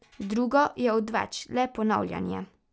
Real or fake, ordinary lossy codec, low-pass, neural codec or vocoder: real; none; none; none